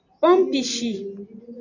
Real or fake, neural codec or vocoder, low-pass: real; none; 7.2 kHz